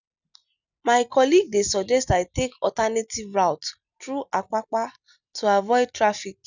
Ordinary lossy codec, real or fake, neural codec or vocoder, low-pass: none; real; none; 7.2 kHz